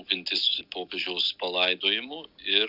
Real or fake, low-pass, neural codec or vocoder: real; 5.4 kHz; none